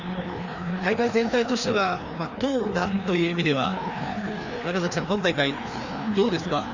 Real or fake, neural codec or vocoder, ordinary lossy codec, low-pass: fake; codec, 16 kHz, 2 kbps, FreqCodec, larger model; none; 7.2 kHz